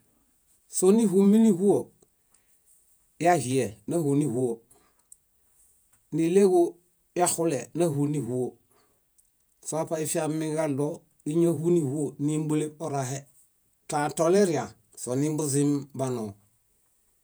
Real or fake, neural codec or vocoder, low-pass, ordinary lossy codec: fake; vocoder, 48 kHz, 128 mel bands, Vocos; none; none